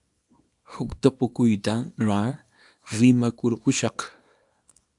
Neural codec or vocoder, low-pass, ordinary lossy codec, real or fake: codec, 24 kHz, 0.9 kbps, WavTokenizer, small release; 10.8 kHz; AAC, 64 kbps; fake